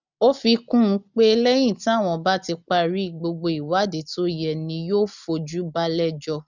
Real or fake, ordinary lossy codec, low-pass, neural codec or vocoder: real; none; 7.2 kHz; none